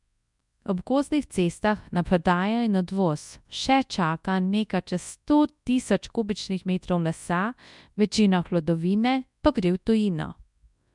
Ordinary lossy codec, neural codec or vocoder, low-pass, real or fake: MP3, 96 kbps; codec, 24 kHz, 0.9 kbps, WavTokenizer, large speech release; 10.8 kHz; fake